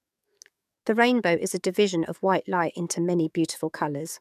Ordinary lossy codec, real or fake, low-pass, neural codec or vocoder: none; fake; 14.4 kHz; codec, 44.1 kHz, 7.8 kbps, DAC